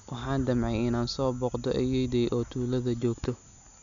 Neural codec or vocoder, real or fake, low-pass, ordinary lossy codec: none; real; 7.2 kHz; none